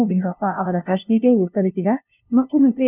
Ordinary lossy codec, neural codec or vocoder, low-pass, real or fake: none; codec, 16 kHz, 0.5 kbps, FunCodec, trained on LibriTTS, 25 frames a second; 3.6 kHz; fake